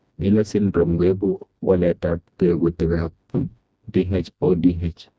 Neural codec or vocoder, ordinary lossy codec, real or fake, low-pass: codec, 16 kHz, 1 kbps, FreqCodec, smaller model; none; fake; none